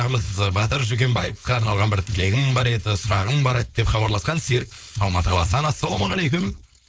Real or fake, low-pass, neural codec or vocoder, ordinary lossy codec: fake; none; codec, 16 kHz, 4.8 kbps, FACodec; none